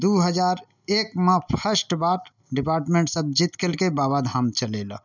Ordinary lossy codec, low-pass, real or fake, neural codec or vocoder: none; 7.2 kHz; real; none